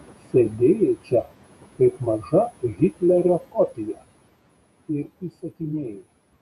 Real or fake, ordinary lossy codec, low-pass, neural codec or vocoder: fake; MP3, 96 kbps; 14.4 kHz; vocoder, 48 kHz, 128 mel bands, Vocos